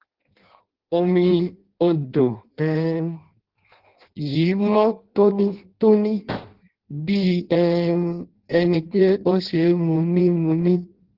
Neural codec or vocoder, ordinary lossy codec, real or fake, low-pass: codec, 16 kHz in and 24 kHz out, 0.6 kbps, FireRedTTS-2 codec; Opus, 16 kbps; fake; 5.4 kHz